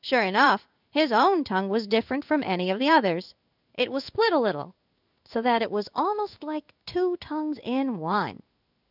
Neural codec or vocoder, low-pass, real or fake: codec, 16 kHz in and 24 kHz out, 1 kbps, XY-Tokenizer; 5.4 kHz; fake